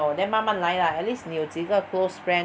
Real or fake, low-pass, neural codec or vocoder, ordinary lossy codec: real; none; none; none